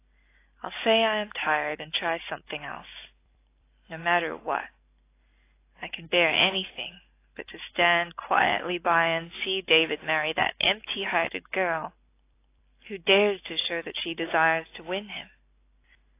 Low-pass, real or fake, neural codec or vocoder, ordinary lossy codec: 3.6 kHz; real; none; AAC, 24 kbps